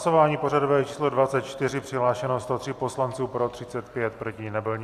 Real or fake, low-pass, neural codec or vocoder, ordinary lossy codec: real; 14.4 kHz; none; AAC, 64 kbps